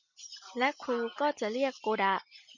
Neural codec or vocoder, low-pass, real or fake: none; 7.2 kHz; real